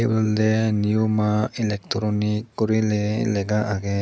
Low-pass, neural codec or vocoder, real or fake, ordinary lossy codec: none; none; real; none